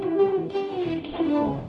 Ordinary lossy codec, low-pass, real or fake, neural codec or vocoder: none; 10.8 kHz; fake; codec, 44.1 kHz, 0.9 kbps, DAC